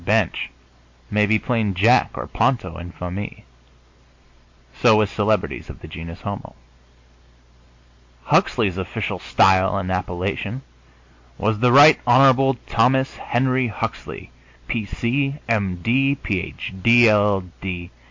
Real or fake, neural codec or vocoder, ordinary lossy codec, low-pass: real; none; MP3, 48 kbps; 7.2 kHz